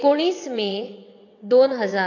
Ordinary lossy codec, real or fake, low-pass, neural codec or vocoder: AAC, 48 kbps; fake; 7.2 kHz; vocoder, 22.05 kHz, 80 mel bands, WaveNeXt